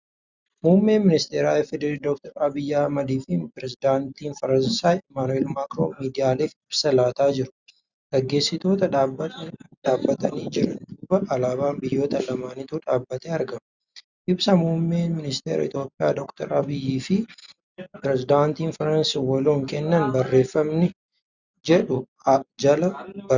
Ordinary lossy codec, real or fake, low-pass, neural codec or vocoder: Opus, 64 kbps; real; 7.2 kHz; none